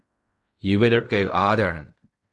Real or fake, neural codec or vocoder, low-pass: fake; codec, 16 kHz in and 24 kHz out, 0.4 kbps, LongCat-Audio-Codec, fine tuned four codebook decoder; 10.8 kHz